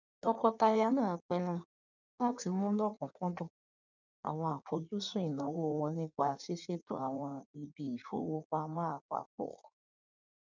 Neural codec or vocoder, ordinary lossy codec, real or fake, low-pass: codec, 16 kHz in and 24 kHz out, 1.1 kbps, FireRedTTS-2 codec; none; fake; 7.2 kHz